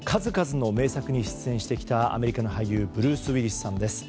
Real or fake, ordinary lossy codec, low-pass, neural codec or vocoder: real; none; none; none